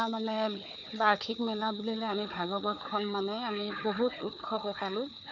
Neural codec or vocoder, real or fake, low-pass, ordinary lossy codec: codec, 16 kHz, 4 kbps, FunCodec, trained on Chinese and English, 50 frames a second; fake; 7.2 kHz; none